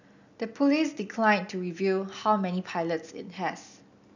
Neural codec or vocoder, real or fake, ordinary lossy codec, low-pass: none; real; none; 7.2 kHz